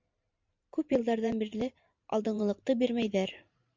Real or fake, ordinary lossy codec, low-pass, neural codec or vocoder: fake; MP3, 64 kbps; 7.2 kHz; vocoder, 44.1 kHz, 128 mel bands every 512 samples, BigVGAN v2